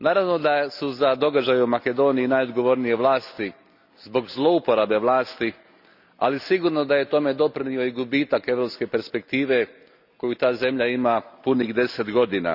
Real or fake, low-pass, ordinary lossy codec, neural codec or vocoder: real; 5.4 kHz; none; none